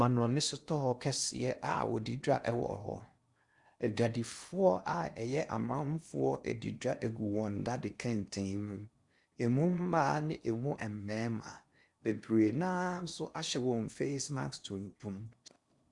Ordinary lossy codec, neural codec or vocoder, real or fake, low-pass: Opus, 64 kbps; codec, 16 kHz in and 24 kHz out, 0.8 kbps, FocalCodec, streaming, 65536 codes; fake; 10.8 kHz